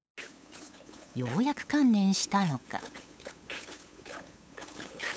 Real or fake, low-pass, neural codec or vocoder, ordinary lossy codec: fake; none; codec, 16 kHz, 8 kbps, FunCodec, trained on LibriTTS, 25 frames a second; none